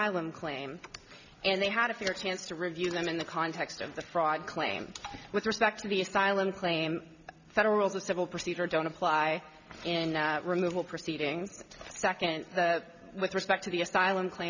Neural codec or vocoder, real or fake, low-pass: none; real; 7.2 kHz